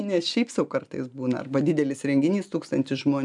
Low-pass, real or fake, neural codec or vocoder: 10.8 kHz; real; none